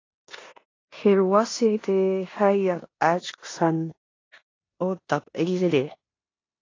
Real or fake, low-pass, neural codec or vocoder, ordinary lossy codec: fake; 7.2 kHz; codec, 16 kHz in and 24 kHz out, 0.9 kbps, LongCat-Audio-Codec, four codebook decoder; AAC, 32 kbps